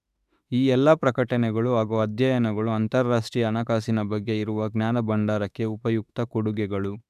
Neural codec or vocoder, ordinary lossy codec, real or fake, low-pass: autoencoder, 48 kHz, 32 numbers a frame, DAC-VAE, trained on Japanese speech; none; fake; 14.4 kHz